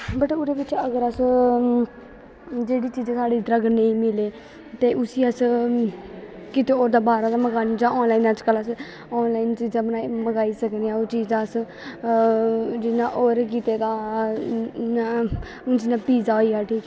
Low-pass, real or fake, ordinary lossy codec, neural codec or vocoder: none; real; none; none